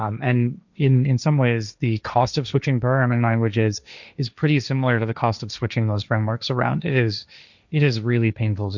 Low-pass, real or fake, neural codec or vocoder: 7.2 kHz; fake; codec, 16 kHz, 1.1 kbps, Voila-Tokenizer